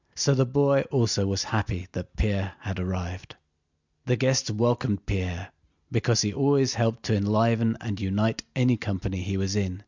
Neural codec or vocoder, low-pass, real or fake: none; 7.2 kHz; real